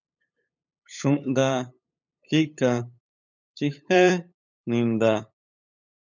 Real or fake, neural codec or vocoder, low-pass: fake; codec, 16 kHz, 8 kbps, FunCodec, trained on LibriTTS, 25 frames a second; 7.2 kHz